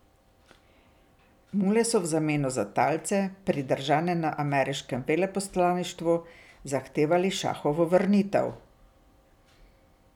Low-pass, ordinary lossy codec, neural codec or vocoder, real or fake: 19.8 kHz; none; none; real